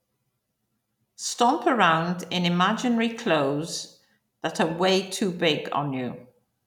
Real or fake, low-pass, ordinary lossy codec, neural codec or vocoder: real; 19.8 kHz; none; none